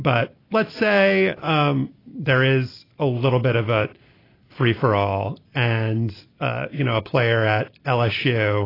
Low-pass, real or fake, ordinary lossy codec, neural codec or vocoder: 5.4 kHz; real; AAC, 24 kbps; none